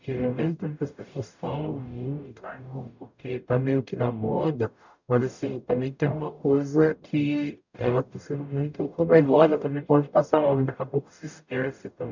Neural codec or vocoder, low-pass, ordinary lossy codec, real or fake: codec, 44.1 kHz, 0.9 kbps, DAC; 7.2 kHz; none; fake